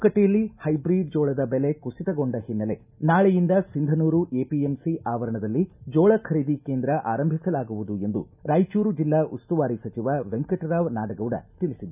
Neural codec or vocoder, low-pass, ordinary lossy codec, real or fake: none; 3.6 kHz; none; real